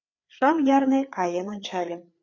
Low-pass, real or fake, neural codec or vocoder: 7.2 kHz; fake; codec, 16 kHz, 4 kbps, FreqCodec, larger model